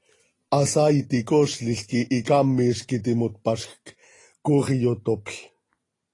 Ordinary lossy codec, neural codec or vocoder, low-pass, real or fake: AAC, 32 kbps; none; 10.8 kHz; real